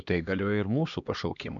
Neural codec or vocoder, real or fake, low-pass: codec, 16 kHz, 2 kbps, X-Codec, HuBERT features, trained on LibriSpeech; fake; 7.2 kHz